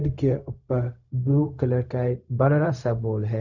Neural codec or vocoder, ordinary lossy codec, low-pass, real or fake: codec, 16 kHz, 0.4 kbps, LongCat-Audio-Codec; none; 7.2 kHz; fake